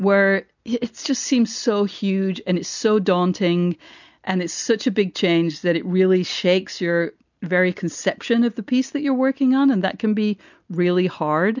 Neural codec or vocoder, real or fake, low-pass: none; real; 7.2 kHz